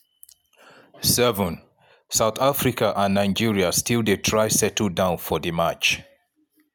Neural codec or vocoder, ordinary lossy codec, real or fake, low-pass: none; none; real; none